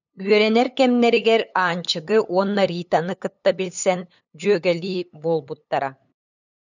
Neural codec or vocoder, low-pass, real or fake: codec, 16 kHz, 8 kbps, FunCodec, trained on LibriTTS, 25 frames a second; 7.2 kHz; fake